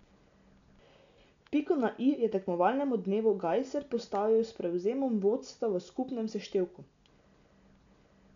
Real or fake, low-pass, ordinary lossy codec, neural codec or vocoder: real; 7.2 kHz; none; none